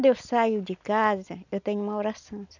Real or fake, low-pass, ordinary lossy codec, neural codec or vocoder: real; 7.2 kHz; none; none